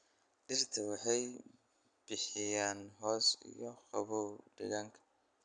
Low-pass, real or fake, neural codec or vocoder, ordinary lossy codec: 9.9 kHz; real; none; none